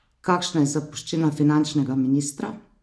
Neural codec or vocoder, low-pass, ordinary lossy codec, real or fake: none; none; none; real